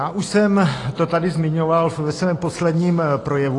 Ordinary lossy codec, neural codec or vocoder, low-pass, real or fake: AAC, 32 kbps; none; 10.8 kHz; real